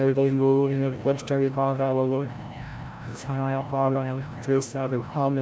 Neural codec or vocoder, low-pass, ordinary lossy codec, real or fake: codec, 16 kHz, 0.5 kbps, FreqCodec, larger model; none; none; fake